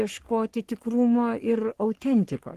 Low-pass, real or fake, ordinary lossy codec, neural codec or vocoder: 14.4 kHz; fake; Opus, 16 kbps; codec, 44.1 kHz, 3.4 kbps, Pupu-Codec